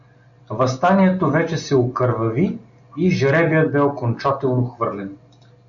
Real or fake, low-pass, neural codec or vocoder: real; 7.2 kHz; none